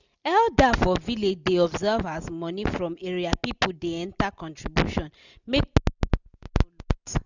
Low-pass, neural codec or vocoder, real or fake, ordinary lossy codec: 7.2 kHz; none; real; none